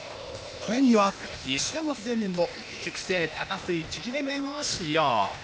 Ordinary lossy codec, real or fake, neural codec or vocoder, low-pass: none; fake; codec, 16 kHz, 0.8 kbps, ZipCodec; none